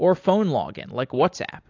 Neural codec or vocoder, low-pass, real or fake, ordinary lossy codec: none; 7.2 kHz; real; AAC, 48 kbps